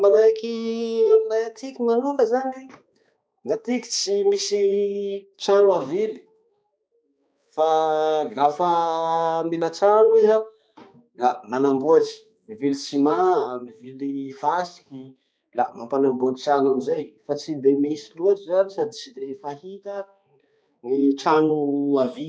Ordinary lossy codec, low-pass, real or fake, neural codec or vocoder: none; none; fake; codec, 16 kHz, 2 kbps, X-Codec, HuBERT features, trained on balanced general audio